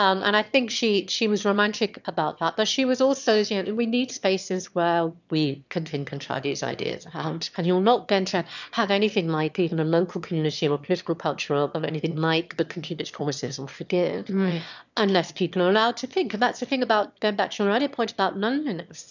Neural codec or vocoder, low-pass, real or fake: autoencoder, 22.05 kHz, a latent of 192 numbers a frame, VITS, trained on one speaker; 7.2 kHz; fake